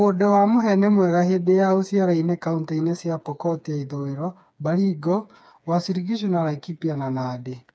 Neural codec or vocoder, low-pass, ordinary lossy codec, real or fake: codec, 16 kHz, 4 kbps, FreqCodec, smaller model; none; none; fake